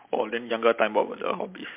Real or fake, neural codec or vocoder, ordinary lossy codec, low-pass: real; none; MP3, 32 kbps; 3.6 kHz